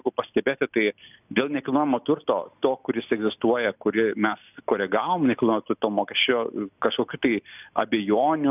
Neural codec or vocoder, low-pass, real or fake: none; 3.6 kHz; real